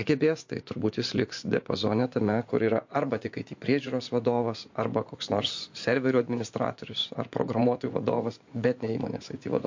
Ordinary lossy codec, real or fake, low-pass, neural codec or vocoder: MP3, 48 kbps; fake; 7.2 kHz; vocoder, 24 kHz, 100 mel bands, Vocos